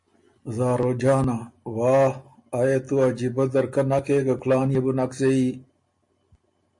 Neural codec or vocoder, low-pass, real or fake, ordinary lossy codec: none; 10.8 kHz; real; MP3, 48 kbps